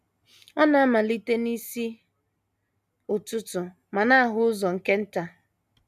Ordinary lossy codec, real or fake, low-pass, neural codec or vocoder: none; real; 14.4 kHz; none